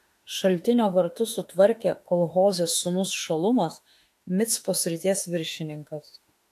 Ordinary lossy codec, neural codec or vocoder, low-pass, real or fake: AAC, 64 kbps; autoencoder, 48 kHz, 32 numbers a frame, DAC-VAE, trained on Japanese speech; 14.4 kHz; fake